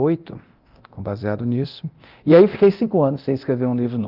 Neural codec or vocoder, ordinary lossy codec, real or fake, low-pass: codec, 24 kHz, 0.9 kbps, DualCodec; Opus, 32 kbps; fake; 5.4 kHz